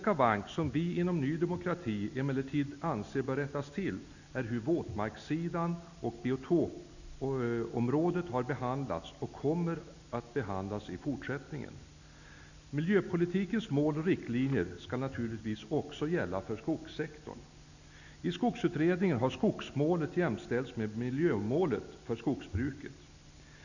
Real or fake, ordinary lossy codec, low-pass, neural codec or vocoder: real; none; 7.2 kHz; none